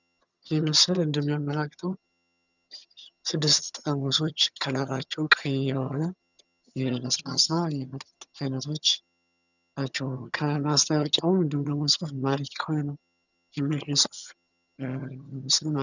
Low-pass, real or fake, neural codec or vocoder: 7.2 kHz; fake; vocoder, 22.05 kHz, 80 mel bands, HiFi-GAN